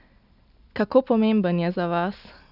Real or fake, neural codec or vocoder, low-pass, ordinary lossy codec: real; none; 5.4 kHz; none